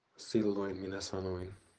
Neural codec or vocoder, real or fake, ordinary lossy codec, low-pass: vocoder, 24 kHz, 100 mel bands, Vocos; fake; Opus, 16 kbps; 9.9 kHz